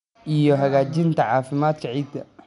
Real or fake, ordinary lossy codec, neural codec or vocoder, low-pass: real; none; none; 10.8 kHz